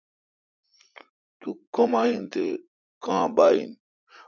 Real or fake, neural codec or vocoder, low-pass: fake; vocoder, 44.1 kHz, 80 mel bands, Vocos; 7.2 kHz